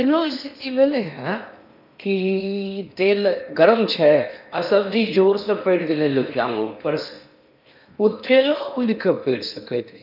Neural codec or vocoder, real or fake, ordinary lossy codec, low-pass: codec, 16 kHz in and 24 kHz out, 0.8 kbps, FocalCodec, streaming, 65536 codes; fake; none; 5.4 kHz